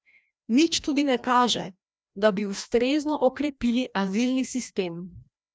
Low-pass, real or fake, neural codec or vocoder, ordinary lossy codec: none; fake; codec, 16 kHz, 1 kbps, FreqCodec, larger model; none